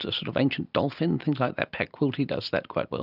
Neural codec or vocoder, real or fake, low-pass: none; real; 5.4 kHz